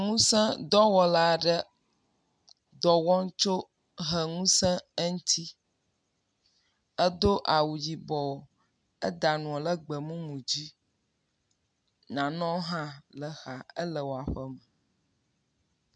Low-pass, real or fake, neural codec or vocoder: 9.9 kHz; real; none